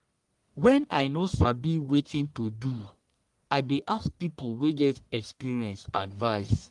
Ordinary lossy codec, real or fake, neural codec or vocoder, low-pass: Opus, 32 kbps; fake; codec, 44.1 kHz, 1.7 kbps, Pupu-Codec; 10.8 kHz